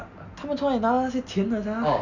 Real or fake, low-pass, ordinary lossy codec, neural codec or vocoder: real; 7.2 kHz; none; none